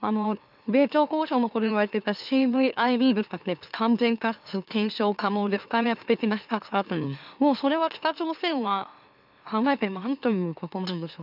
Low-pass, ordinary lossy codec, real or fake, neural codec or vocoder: 5.4 kHz; none; fake; autoencoder, 44.1 kHz, a latent of 192 numbers a frame, MeloTTS